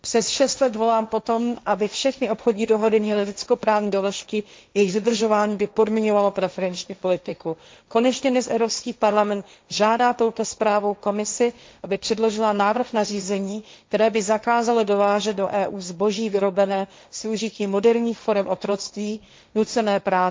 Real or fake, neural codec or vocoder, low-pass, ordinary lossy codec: fake; codec, 16 kHz, 1.1 kbps, Voila-Tokenizer; none; none